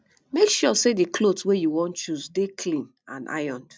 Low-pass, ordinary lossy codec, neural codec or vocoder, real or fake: none; none; none; real